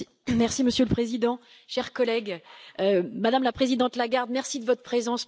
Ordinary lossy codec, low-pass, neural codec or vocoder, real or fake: none; none; none; real